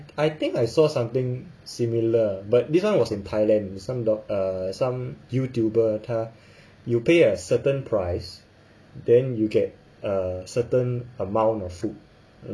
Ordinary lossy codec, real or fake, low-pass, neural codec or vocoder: none; real; none; none